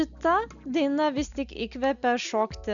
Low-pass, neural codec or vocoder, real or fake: 7.2 kHz; none; real